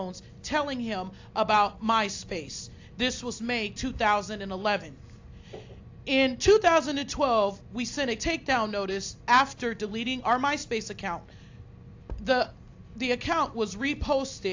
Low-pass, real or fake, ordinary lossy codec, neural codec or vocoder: 7.2 kHz; real; AAC, 48 kbps; none